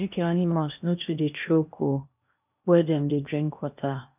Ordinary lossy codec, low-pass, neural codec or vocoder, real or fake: none; 3.6 kHz; codec, 16 kHz in and 24 kHz out, 0.8 kbps, FocalCodec, streaming, 65536 codes; fake